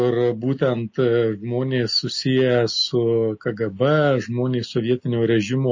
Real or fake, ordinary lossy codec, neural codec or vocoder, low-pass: real; MP3, 32 kbps; none; 7.2 kHz